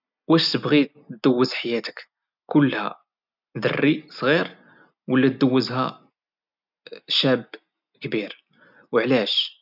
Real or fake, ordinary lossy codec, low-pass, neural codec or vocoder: real; none; 5.4 kHz; none